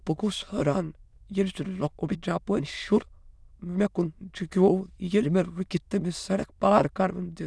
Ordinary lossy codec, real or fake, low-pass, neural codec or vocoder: none; fake; none; autoencoder, 22.05 kHz, a latent of 192 numbers a frame, VITS, trained on many speakers